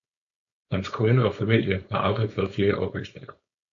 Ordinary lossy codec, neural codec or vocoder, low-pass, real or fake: AAC, 64 kbps; codec, 16 kHz, 4.8 kbps, FACodec; 7.2 kHz; fake